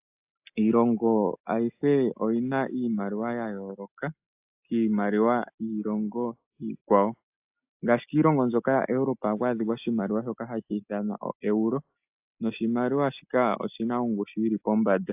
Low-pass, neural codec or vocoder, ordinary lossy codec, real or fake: 3.6 kHz; none; AAC, 32 kbps; real